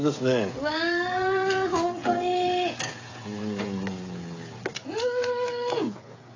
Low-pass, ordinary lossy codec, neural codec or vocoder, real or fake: 7.2 kHz; AAC, 32 kbps; codec, 16 kHz, 16 kbps, FreqCodec, smaller model; fake